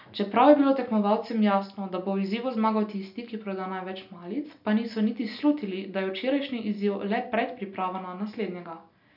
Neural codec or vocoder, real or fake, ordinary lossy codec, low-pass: none; real; none; 5.4 kHz